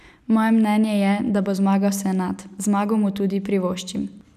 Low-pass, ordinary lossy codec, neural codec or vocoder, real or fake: 14.4 kHz; none; none; real